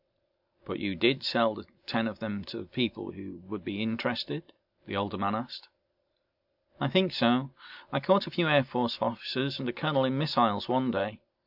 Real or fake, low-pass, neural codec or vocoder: real; 5.4 kHz; none